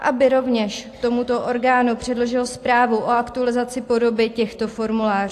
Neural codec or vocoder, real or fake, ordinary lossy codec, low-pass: none; real; AAC, 64 kbps; 14.4 kHz